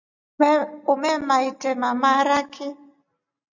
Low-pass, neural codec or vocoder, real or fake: 7.2 kHz; none; real